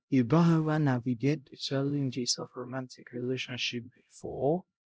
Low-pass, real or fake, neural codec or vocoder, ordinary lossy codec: none; fake; codec, 16 kHz, 0.5 kbps, X-Codec, HuBERT features, trained on LibriSpeech; none